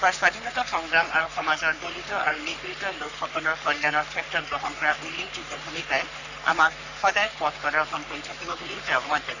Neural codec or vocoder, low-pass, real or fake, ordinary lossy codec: codec, 44.1 kHz, 3.4 kbps, Pupu-Codec; 7.2 kHz; fake; none